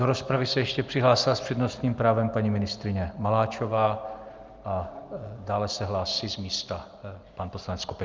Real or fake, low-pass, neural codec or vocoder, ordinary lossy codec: real; 7.2 kHz; none; Opus, 24 kbps